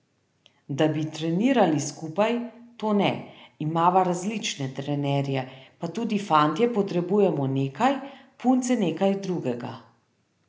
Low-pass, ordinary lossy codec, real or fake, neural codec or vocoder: none; none; real; none